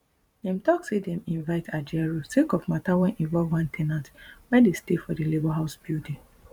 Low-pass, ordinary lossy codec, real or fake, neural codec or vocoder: 19.8 kHz; none; fake; vocoder, 44.1 kHz, 128 mel bands every 512 samples, BigVGAN v2